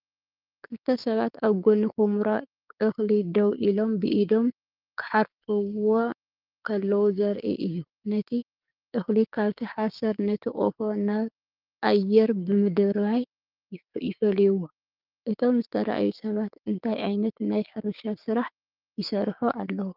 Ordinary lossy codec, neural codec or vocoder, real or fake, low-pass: Opus, 24 kbps; codec, 16 kHz, 6 kbps, DAC; fake; 5.4 kHz